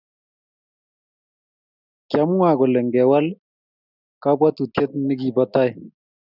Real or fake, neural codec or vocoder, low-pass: real; none; 5.4 kHz